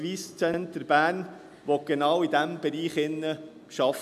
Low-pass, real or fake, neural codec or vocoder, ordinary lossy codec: 14.4 kHz; real; none; none